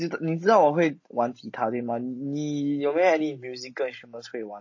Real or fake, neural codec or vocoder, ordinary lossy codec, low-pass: real; none; MP3, 32 kbps; 7.2 kHz